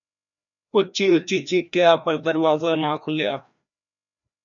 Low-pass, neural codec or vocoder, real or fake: 7.2 kHz; codec, 16 kHz, 1 kbps, FreqCodec, larger model; fake